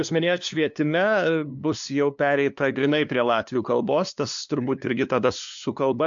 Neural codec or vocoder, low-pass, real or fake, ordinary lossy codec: codec, 16 kHz, 2 kbps, FunCodec, trained on LibriTTS, 25 frames a second; 7.2 kHz; fake; AAC, 64 kbps